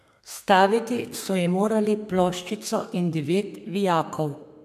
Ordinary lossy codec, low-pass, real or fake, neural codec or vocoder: none; 14.4 kHz; fake; codec, 32 kHz, 1.9 kbps, SNAC